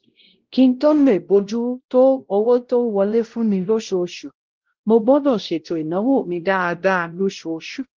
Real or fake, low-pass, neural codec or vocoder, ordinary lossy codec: fake; 7.2 kHz; codec, 16 kHz, 0.5 kbps, X-Codec, WavLM features, trained on Multilingual LibriSpeech; Opus, 16 kbps